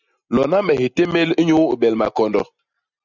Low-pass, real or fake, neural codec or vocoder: 7.2 kHz; real; none